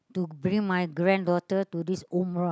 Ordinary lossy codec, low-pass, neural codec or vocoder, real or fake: none; none; none; real